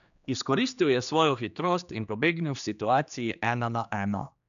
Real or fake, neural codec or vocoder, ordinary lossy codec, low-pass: fake; codec, 16 kHz, 2 kbps, X-Codec, HuBERT features, trained on general audio; none; 7.2 kHz